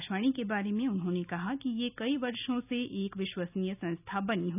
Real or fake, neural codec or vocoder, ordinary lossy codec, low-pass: real; none; none; 3.6 kHz